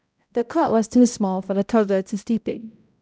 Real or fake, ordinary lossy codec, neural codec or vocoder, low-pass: fake; none; codec, 16 kHz, 0.5 kbps, X-Codec, HuBERT features, trained on balanced general audio; none